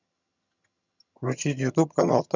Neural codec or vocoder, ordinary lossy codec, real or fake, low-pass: vocoder, 22.05 kHz, 80 mel bands, HiFi-GAN; none; fake; 7.2 kHz